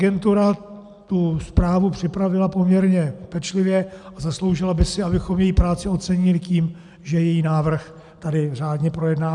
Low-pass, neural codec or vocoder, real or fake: 10.8 kHz; none; real